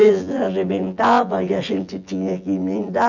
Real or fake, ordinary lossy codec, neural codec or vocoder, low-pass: fake; none; vocoder, 24 kHz, 100 mel bands, Vocos; 7.2 kHz